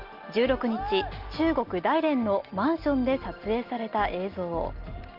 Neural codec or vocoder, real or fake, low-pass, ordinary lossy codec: none; real; 5.4 kHz; Opus, 32 kbps